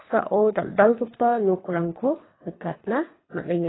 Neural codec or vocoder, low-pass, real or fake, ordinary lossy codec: codec, 24 kHz, 3 kbps, HILCodec; 7.2 kHz; fake; AAC, 16 kbps